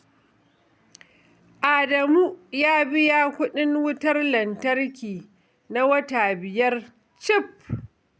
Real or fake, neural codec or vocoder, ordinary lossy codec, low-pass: real; none; none; none